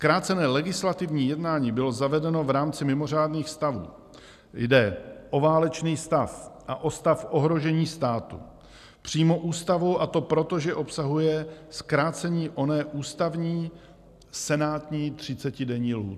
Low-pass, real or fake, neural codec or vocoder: 14.4 kHz; real; none